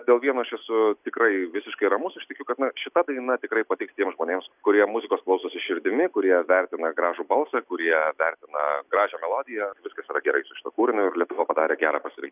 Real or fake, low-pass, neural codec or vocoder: real; 3.6 kHz; none